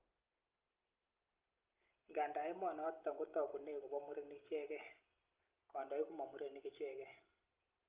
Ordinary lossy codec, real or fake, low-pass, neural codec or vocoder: Opus, 24 kbps; real; 3.6 kHz; none